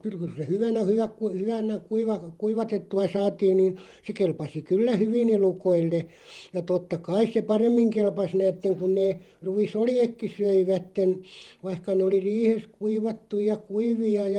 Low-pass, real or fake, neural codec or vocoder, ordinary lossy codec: 19.8 kHz; fake; vocoder, 48 kHz, 128 mel bands, Vocos; Opus, 24 kbps